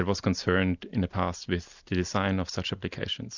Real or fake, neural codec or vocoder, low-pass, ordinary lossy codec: real; none; 7.2 kHz; Opus, 64 kbps